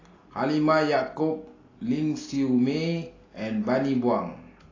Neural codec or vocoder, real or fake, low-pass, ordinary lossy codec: none; real; 7.2 kHz; AAC, 32 kbps